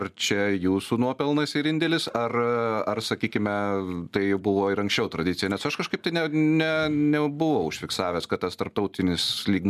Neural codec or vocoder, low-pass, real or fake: none; 14.4 kHz; real